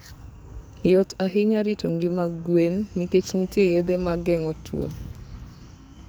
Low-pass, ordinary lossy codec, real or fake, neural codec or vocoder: none; none; fake; codec, 44.1 kHz, 2.6 kbps, SNAC